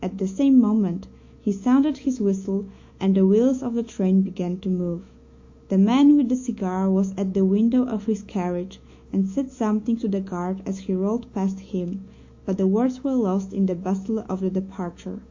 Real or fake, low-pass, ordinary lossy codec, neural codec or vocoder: fake; 7.2 kHz; AAC, 48 kbps; autoencoder, 48 kHz, 128 numbers a frame, DAC-VAE, trained on Japanese speech